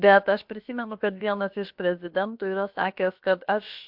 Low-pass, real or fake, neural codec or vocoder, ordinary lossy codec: 5.4 kHz; fake; codec, 16 kHz, about 1 kbps, DyCAST, with the encoder's durations; MP3, 48 kbps